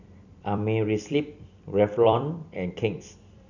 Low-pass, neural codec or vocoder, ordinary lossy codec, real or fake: 7.2 kHz; vocoder, 44.1 kHz, 128 mel bands every 256 samples, BigVGAN v2; none; fake